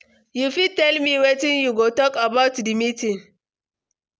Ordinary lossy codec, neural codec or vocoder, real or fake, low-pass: none; none; real; none